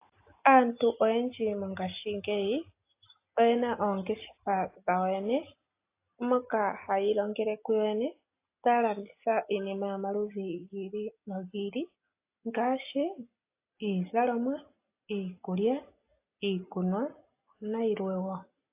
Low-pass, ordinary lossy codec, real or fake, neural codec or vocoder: 3.6 kHz; AAC, 24 kbps; real; none